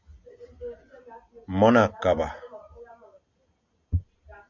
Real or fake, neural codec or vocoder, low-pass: real; none; 7.2 kHz